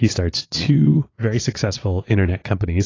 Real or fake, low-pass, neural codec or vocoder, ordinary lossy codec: fake; 7.2 kHz; vocoder, 22.05 kHz, 80 mel bands, Vocos; AAC, 32 kbps